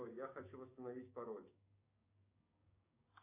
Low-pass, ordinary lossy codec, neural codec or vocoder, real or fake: 3.6 kHz; AAC, 32 kbps; codec, 16 kHz, 6 kbps, DAC; fake